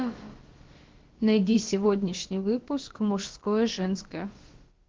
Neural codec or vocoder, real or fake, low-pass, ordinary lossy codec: codec, 16 kHz, about 1 kbps, DyCAST, with the encoder's durations; fake; 7.2 kHz; Opus, 16 kbps